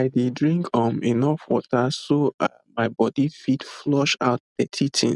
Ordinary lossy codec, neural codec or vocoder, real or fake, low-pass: none; none; real; 10.8 kHz